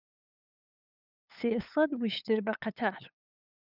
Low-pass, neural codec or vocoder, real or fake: 5.4 kHz; codec, 16 kHz, 8 kbps, FunCodec, trained on LibriTTS, 25 frames a second; fake